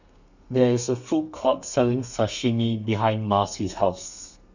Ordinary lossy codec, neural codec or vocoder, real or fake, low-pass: none; codec, 32 kHz, 1.9 kbps, SNAC; fake; 7.2 kHz